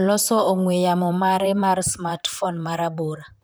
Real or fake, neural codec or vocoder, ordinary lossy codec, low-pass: fake; vocoder, 44.1 kHz, 128 mel bands, Pupu-Vocoder; none; none